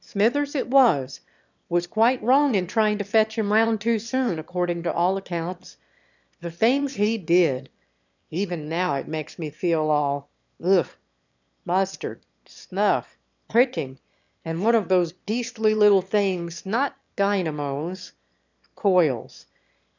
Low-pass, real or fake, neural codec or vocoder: 7.2 kHz; fake; autoencoder, 22.05 kHz, a latent of 192 numbers a frame, VITS, trained on one speaker